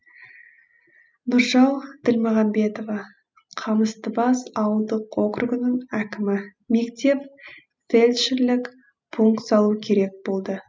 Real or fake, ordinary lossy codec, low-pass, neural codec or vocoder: real; none; none; none